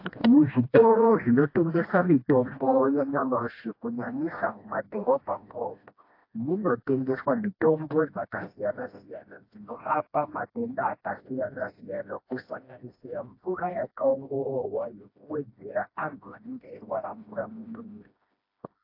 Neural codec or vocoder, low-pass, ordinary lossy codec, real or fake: codec, 16 kHz, 1 kbps, FreqCodec, smaller model; 5.4 kHz; AAC, 32 kbps; fake